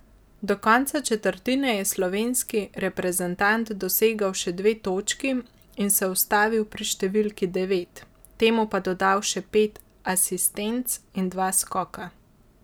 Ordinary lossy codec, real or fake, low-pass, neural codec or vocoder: none; real; none; none